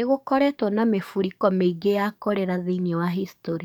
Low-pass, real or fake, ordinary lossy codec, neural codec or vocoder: 19.8 kHz; fake; none; codec, 44.1 kHz, 7.8 kbps, DAC